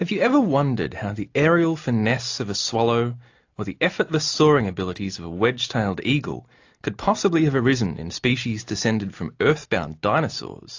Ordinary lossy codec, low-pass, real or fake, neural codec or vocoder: AAC, 48 kbps; 7.2 kHz; real; none